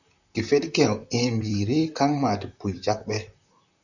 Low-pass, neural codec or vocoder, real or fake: 7.2 kHz; vocoder, 44.1 kHz, 128 mel bands, Pupu-Vocoder; fake